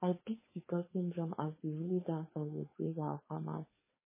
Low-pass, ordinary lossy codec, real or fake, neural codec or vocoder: 3.6 kHz; MP3, 16 kbps; fake; codec, 16 kHz, 4.8 kbps, FACodec